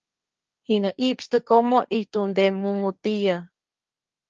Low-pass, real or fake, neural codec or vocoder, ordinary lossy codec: 7.2 kHz; fake; codec, 16 kHz, 1.1 kbps, Voila-Tokenizer; Opus, 24 kbps